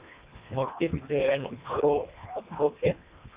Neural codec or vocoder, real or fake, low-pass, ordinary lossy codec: codec, 24 kHz, 1.5 kbps, HILCodec; fake; 3.6 kHz; Opus, 64 kbps